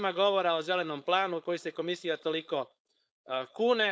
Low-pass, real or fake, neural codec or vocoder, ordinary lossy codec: none; fake; codec, 16 kHz, 4.8 kbps, FACodec; none